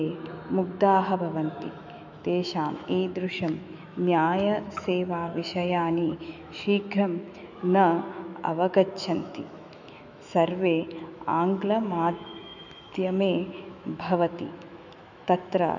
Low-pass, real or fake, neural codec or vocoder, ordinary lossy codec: 7.2 kHz; fake; autoencoder, 48 kHz, 128 numbers a frame, DAC-VAE, trained on Japanese speech; none